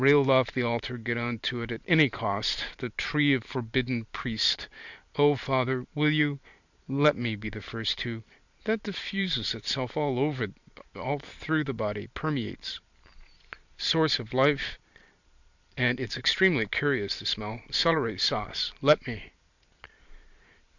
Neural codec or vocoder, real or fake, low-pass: vocoder, 44.1 kHz, 128 mel bands every 512 samples, BigVGAN v2; fake; 7.2 kHz